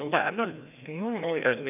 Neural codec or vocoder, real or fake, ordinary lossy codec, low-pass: codec, 16 kHz, 1 kbps, FreqCodec, larger model; fake; none; 3.6 kHz